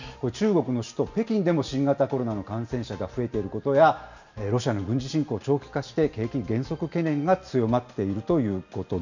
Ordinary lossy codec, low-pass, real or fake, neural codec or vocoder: none; 7.2 kHz; real; none